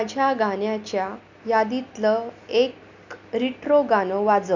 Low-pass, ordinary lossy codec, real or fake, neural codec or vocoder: 7.2 kHz; none; real; none